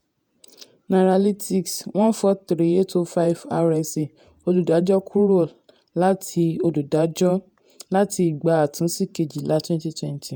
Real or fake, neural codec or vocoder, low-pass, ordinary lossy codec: fake; vocoder, 48 kHz, 128 mel bands, Vocos; none; none